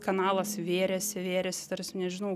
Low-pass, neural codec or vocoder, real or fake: 14.4 kHz; none; real